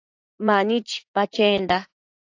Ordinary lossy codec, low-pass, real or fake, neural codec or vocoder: AAC, 48 kbps; 7.2 kHz; fake; vocoder, 44.1 kHz, 80 mel bands, Vocos